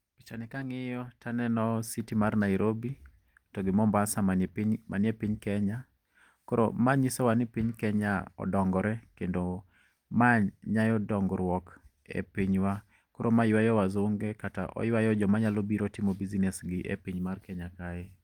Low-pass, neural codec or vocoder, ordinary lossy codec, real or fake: 19.8 kHz; none; Opus, 24 kbps; real